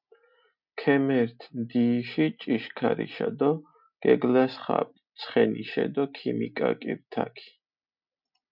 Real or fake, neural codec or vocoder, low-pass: real; none; 5.4 kHz